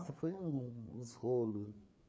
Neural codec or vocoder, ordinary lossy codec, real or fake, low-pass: codec, 16 kHz, 4 kbps, FreqCodec, larger model; none; fake; none